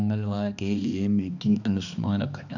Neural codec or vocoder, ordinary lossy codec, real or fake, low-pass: codec, 16 kHz, 2 kbps, X-Codec, HuBERT features, trained on balanced general audio; none; fake; 7.2 kHz